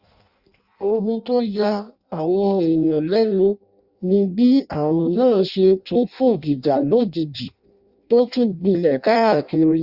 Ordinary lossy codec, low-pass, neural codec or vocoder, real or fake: Opus, 64 kbps; 5.4 kHz; codec, 16 kHz in and 24 kHz out, 0.6 kbps, FireRedTTS-2 codec; fake